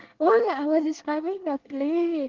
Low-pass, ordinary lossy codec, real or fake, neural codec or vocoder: 7.2 kHz; Opus, 16 kbps; fake; codec, 24 kHz, 1 kbps, SNAC